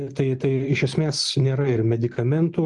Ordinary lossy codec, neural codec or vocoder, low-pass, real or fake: Opus, 24 kbps; none; 10.8 kHz; real